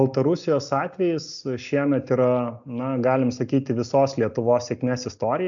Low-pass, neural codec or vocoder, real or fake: 7.2 kHz; none; real